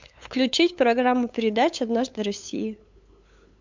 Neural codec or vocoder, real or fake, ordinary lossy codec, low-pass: codec, 16 kHz, 8 kbps, FunCodec, trained on LibriTTS, 25 frames a second; fake; MP3, 64 kbps; 7.2 kHz